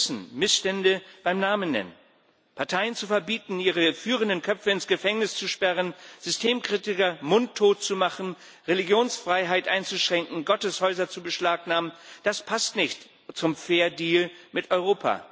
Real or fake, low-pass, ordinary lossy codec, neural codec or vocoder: real; none; none; none